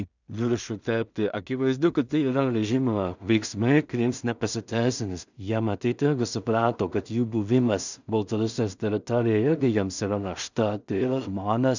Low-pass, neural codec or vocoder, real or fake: 7.2 kHz; codec, 16 kHz in and 24 kHz out, 0.4 kbps, LongCat-Audio-Codec, two codebook decoder; fake